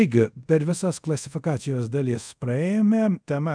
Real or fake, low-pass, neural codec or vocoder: fake; 9.9 kHz; codec, 24 kHz, 0.5 kbps, DualCodec